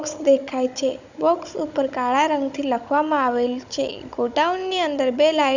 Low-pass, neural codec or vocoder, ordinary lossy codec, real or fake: 7.2 kHz; codec, 16 kHz, 16 kbps, FunCodec, trained on Chinese and English, 50 frames a second; none; fake